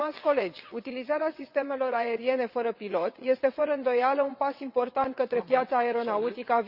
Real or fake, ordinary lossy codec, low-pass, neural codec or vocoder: fake; Opus, 64 kbps; 5.4 kHz; vocoder, 22.05 kHz, 80 mel bands, WaveNeXt